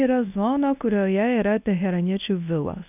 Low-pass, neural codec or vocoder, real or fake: 3.6 kHz; codec, 24 kHz, 0.9 kbps, WavTokenizer, medium speech release version 1; fake